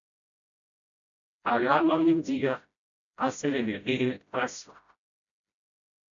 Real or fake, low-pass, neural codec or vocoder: fake; 7.2 kHz; codec, 16 kHz, 0.5 kbps, FreqCodec, smaller model